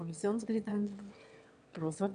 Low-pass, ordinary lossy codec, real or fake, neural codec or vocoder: 9.9 kHz; none; fake; autoencoder, 22.05 kHz, a latent of 192 numbers a frame, VITS, trained on one speaker